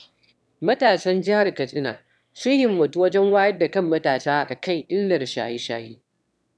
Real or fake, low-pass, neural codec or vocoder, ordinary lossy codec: fake; none; autoencoder, 22.05 kHz, a latent of 192 numbers a frame, VITS, trained on one speaker; none